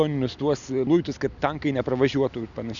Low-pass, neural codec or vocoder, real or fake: 7.2 kHz; none; real